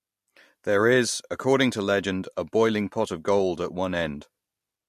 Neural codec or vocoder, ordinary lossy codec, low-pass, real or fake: none; MP3, 64 kbps; 14.4 kHz; real